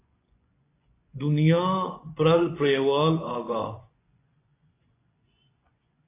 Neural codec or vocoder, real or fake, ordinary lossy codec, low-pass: vocoder, 24 kHz, 100 mel bands, Vocos; fake; AAC, 24 kbps; 3.6 kHz